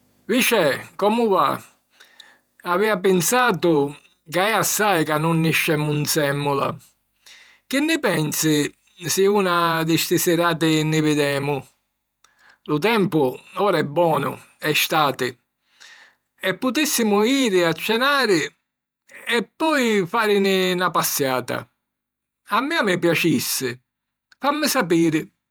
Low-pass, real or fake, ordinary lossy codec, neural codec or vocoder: none; fake; none; vocoder, 48 kHz, 128 mel bands, Vocos